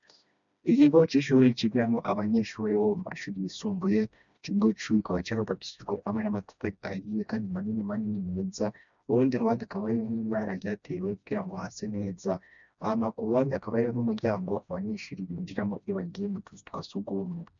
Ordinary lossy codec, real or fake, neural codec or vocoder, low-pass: MP3, 64 kbps; fake; codec, 16 kHz, 1 kbps, FreqCodec, smaller model; 7.2 kHz